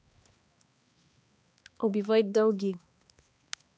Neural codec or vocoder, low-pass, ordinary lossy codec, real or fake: codec, 16 kHz, 2 kbps, X-Codec, HuBERT features, trained on balanced general audio; none; none; fake